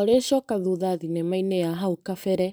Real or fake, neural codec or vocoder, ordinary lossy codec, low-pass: fake; vocoder, 44.1 kHz, 128 mel bands every 512 samples, BigVGAN v2; none; none